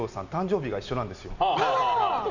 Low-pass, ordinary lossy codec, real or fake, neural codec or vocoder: 7.2 kHz; none; real; none